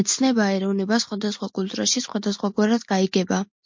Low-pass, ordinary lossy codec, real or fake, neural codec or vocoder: 7.2 kHz; MP3, 48 kbps; real; none